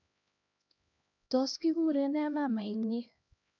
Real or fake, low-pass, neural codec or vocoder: fake; 7.2 kHz; codec, 16 kHz, 1 kbps, X-Codec, HuBERT features, trained on LibriSpeech